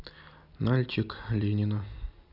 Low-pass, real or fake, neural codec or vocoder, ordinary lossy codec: 5.4 kHz; real; none; none